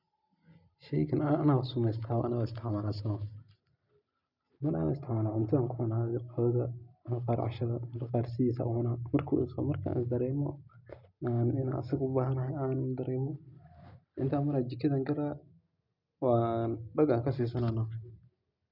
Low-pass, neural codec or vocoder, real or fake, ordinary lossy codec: 5.4 kHz; none; real; none